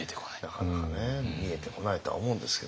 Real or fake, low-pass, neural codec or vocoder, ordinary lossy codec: real; none; none; none